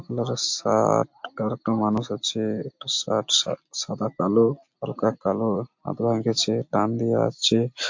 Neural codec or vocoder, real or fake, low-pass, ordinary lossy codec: none; real; 7.2 kHz; MP3, 48 kbps